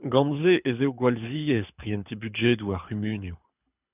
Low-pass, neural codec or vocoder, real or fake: 3.6 kHz; codec, 24 kHz, 6 kbps, HILCodec; fake